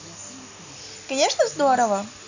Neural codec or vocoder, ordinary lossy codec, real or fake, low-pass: none; none; real; 7.2 kHz